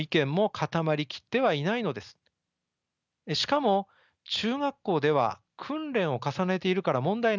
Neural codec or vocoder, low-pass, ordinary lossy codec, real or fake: none; 7.2 kHz; none; real